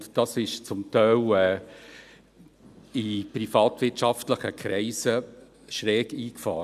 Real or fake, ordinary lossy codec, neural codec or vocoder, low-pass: real; none; none; 14.4 kHz